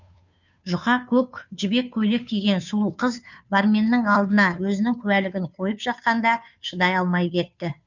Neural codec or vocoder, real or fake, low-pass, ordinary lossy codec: codec, 16 kHz, 2 kbps, FunCodec, trained on Chinese and English, 25 frames a second; fake; 7.2 kHz; none